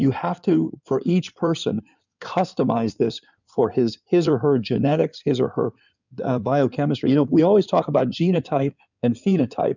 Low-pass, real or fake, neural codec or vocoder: 7.2 kHz; fake; codec, 16 kHz in and 24 kHz out, 2.2 kbps, FireRedTTS-2 codec